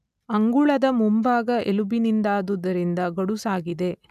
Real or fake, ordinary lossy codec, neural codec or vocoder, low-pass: real; none; none; 14.4 kHz